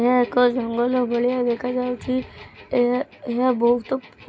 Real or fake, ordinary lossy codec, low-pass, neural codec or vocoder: real; none; none; none